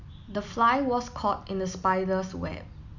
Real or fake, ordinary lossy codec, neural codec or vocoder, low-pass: real; none; none; 7.2 kHz